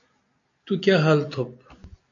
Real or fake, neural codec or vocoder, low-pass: real; none; 7.2 kHz